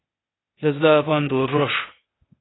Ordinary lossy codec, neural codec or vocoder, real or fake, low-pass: AAC, 16 kbps; codec, 16 kHz, 0.8 kbps, ZipCodec; fake; 7.2 kHz